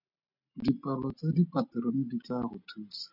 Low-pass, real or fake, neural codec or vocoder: 5.4 kHz; real; none